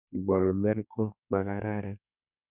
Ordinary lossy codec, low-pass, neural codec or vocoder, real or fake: none; 3.6 kHz; codec, 32 kHz, 1.9 kbps, SNAC; fake